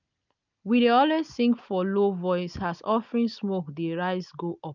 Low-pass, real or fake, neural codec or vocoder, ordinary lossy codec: 7.2 kHz; real; none; none